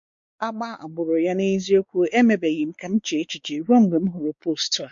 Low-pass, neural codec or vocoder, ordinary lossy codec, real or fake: 7.2 kHz; codec, 16 kHz, 4 kbps, X-Codec, WavLM features, trained on Multilingual LibriSpeech; MP3, 48 kbps; fake